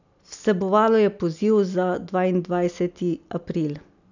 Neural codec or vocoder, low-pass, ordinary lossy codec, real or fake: none; 7.2 kHz; none; real